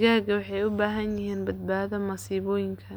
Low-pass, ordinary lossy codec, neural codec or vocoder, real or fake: none; none; none; real